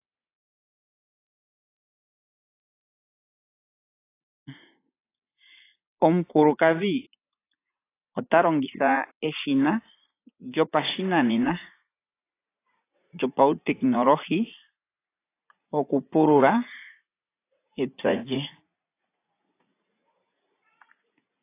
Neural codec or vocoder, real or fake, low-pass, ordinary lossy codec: vocoder, 44.1 kHz, 80 mel bands, Vocos; fake; 3.6 kHz; AAC, 24 kbps